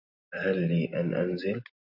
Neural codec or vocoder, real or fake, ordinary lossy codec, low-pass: none; real; AAC, 48 kbps; 5.4 kHz